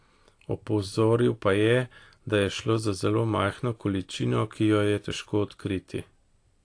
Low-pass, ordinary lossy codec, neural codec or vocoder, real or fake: 9.9 kHz; AAC, 48 kbps; none; real